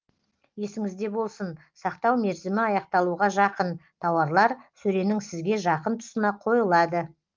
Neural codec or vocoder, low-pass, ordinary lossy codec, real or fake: none; 7.2 kHz; Opus, 32 kbps; real